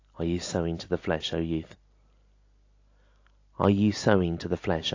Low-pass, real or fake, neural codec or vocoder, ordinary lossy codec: 7.2 kHz; real; none; AAC, 48 kbps